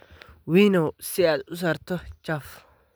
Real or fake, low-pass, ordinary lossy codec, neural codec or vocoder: real; none; none; none